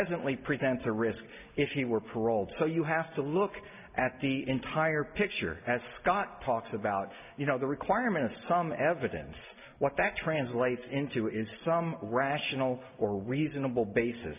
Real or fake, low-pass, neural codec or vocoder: real; 3.6 kHz; none